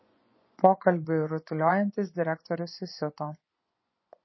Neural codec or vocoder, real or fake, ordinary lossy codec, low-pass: vocoder, 24 kHz, 100 mel bands, Vocos; fake; MP3, 24 kbps; 7.2 kHz